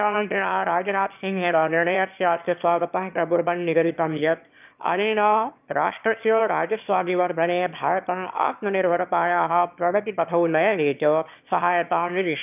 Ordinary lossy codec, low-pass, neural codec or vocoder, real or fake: none; 3.6 kHz; autoencoder, 22.05 kHz, a latent of 192 numbers a frame, VITS, trained on one speaker; fake